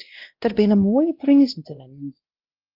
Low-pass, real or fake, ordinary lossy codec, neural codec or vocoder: 5.4 kHz; fake; Opus, 24 kbps; codec, 16 kHz, 1 kbps, X-Codec, WavLM features, trained on Multilingual LibriSpeech